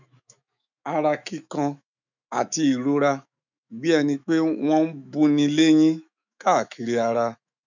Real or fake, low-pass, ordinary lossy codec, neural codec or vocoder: fake; 7.2 kHz; none; autoencoder, 48 kHz, 128 numbers a frame, DAC-VAE, trained on Japanese speech